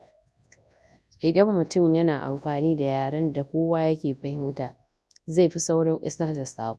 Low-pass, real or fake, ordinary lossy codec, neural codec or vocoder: none; fake; none; codec, 24 kHz, 0.9 kbps, WavTokenizer, large speech release